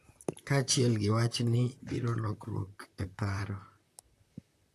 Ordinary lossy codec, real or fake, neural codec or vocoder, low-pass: AAC, 64 kbps; fake; vocoder, 44.1 kHz, 128 mel bands, Pupu-Vocoder; 14.4 kHz